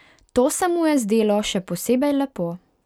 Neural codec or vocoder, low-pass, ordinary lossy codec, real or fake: none; 19.8 kHz; none; real